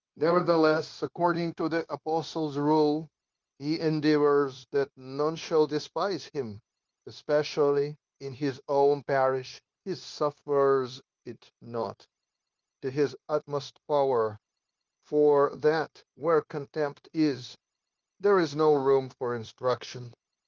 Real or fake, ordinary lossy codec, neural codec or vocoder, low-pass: fake; Opus, 32 kbps; codec, 16 kHz, 0.9 kbps, LongCat-Audio-Codec; 7.2 kHz